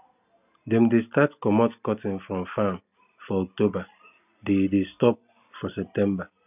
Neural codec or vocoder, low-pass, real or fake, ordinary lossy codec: none; 3.6 kHz; real; none